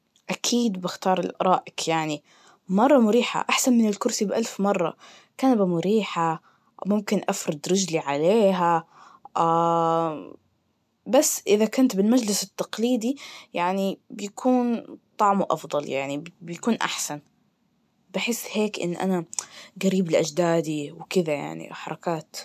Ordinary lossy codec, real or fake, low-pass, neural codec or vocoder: MP3, 96 kbps; real; 14.4 kHz; none